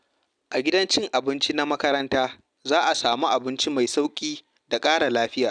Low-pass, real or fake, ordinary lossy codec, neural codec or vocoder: 9.9 kHz; real; none; none